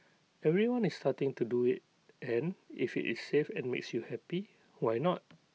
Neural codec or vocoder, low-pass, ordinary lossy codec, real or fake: none; none; none; real